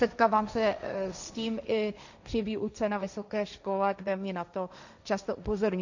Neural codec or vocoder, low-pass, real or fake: codec, 16 kHz, 1.1 kbps, Voila-Tokenizer; 7.2 kHz; fake